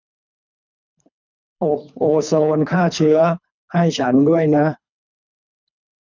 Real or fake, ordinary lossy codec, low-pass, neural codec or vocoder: fake; none; 7.2 kHz; codec, 24 kHz, 3 kbps, HILCodec